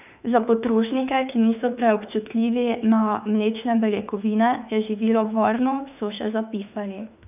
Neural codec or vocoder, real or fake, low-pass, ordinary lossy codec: autoencoder, 48 kHz, 32 numbers a frame, DAC-VAE, trained on Japanese speech; fake; 3.6 kHz; none